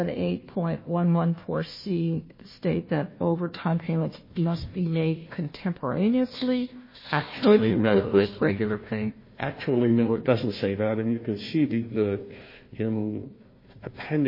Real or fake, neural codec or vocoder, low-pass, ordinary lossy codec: fake; codec, 16 kHz, 1 kbps, FunCodec, trained on Chinese and English, 50 frames a second; 5.4 kHz; MP3, 24 kbps